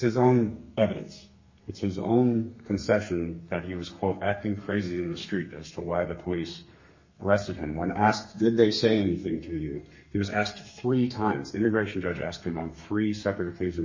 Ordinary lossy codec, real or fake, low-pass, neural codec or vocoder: MP3, 32 kbps; fake; 7.2 kHz; codec, 32 kHz, 1.9 kbps, SNAC